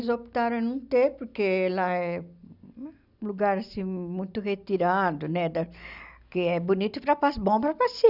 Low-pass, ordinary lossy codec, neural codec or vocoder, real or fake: 5.4 kHz; none; none; real